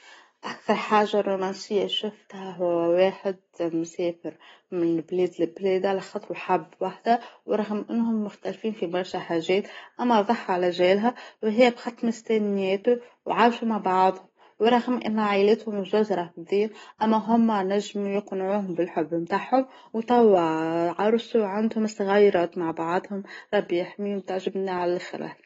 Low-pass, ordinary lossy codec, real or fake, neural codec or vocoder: 19.8 kHz; AAC, 24 kbps; real; none